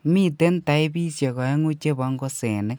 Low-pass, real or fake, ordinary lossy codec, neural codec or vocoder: none; real; none; none